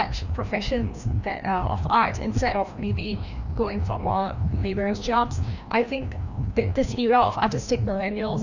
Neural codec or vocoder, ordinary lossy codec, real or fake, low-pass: codec, 16 kHz, 1 kbps, FreqCodec, larger model; none; fake; 7.2 kHz